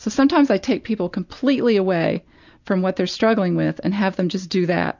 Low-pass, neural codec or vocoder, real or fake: 7.2 kHz; none; real